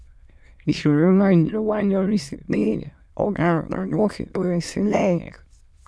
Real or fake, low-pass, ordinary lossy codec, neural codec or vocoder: fake; none; none; autoencoder, 22.05 kHz, a latent of 192 numbers a frame, VITS, trained on many speakers